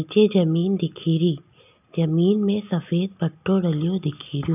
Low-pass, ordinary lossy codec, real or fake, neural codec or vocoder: 3.6 kHz; none; real; none